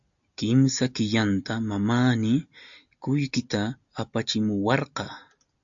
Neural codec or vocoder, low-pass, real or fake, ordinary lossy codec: none; 7.2 kHz; real; AAC, 64 kbps